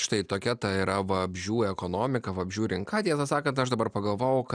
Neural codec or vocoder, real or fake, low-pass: none; real; 9.9 kHz